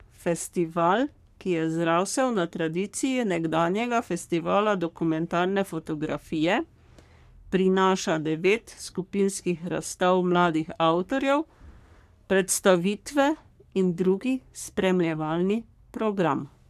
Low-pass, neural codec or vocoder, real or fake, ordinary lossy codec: 14.4 kHz; codec, 44.1 kHz, 3.4 kbps, Pupu-Codec; fake; none